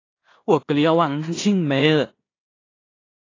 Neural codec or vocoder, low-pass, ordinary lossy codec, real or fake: codec, 16 kHz in and 24 kHz out, 0.4 kbps, LongCat-Audio-Codec, two codebook decoder; 7.2 kHz; AAC, 32 kbps; fake